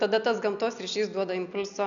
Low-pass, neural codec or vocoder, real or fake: 7.2 kHz; none; real